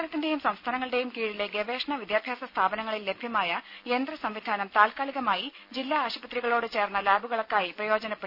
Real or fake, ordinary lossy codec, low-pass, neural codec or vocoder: real; none; 5.4 kHz; none